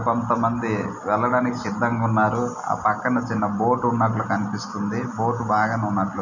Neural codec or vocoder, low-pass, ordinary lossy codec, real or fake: none; 7.2 kHz; none; real